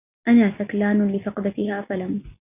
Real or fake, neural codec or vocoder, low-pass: real; none; 3.6 kHz